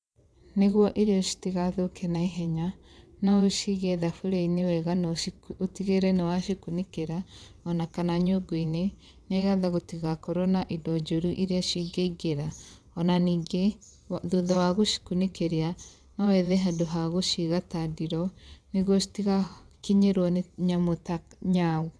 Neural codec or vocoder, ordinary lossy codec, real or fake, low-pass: vocoder, 22.05 kHz, 80 mel bands, WaveNeXt; none; fake; none